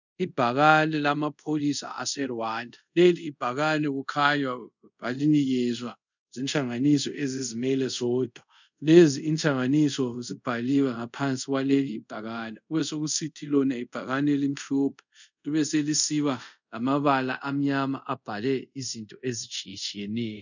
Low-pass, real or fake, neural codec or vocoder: 7.2 kHz; fake; codec, 24 kHz, 0.5 kbps, DualCodec